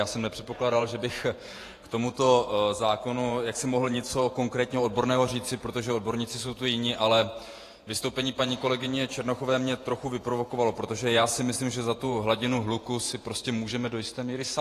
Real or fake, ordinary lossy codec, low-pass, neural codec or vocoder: fake; AAC, 48 kbps; 14.4 kHz; vocoder, 48 kHz, 128 mel bands, Vocos